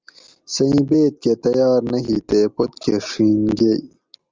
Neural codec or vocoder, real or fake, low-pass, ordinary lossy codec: none; real; 7.2 kHz; Opus, 32 kbps